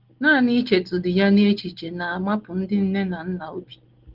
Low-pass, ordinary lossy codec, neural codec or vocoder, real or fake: 5.4 kHz; Opus, 16 kbps; none; real